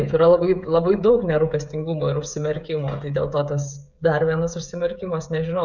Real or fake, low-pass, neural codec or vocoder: fake; 7.2 kHz; codec, 16 kHz, 16 kbps, FreqCodec, smaller model